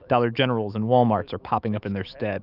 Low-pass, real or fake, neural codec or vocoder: 5.4 kHz; fake; autoencoder, 48 kHz, 128 numbers a frame, DAC-VAE, trained on Japanese speech